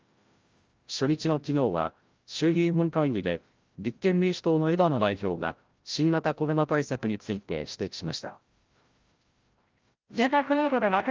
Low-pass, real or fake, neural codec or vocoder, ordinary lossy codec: 7.2 kHz; fake; codec, 16 kHz, 0.5 kbps, FreqCodec, larger model; Opus, 32 kbps